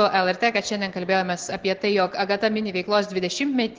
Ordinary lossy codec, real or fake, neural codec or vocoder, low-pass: Opus, 16 kbps; real; none; 7.2 kHz